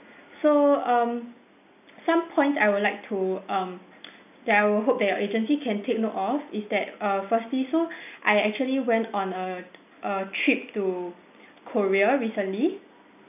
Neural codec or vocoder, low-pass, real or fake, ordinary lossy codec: none; 3.6 kHz; real; none